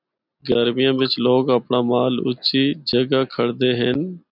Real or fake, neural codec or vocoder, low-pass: real; none; 5.4 kHz